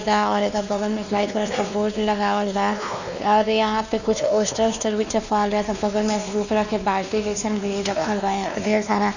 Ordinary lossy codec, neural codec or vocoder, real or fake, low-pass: none; codec, 16 kHz, 2 kbps, X-Codec, WavLM features, trained on Multilingual LibriSpeech; fake; 7.2 kHz